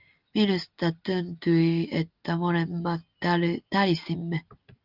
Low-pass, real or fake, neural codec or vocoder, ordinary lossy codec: 5.4 kHz; real; none; Opus, 32 kbps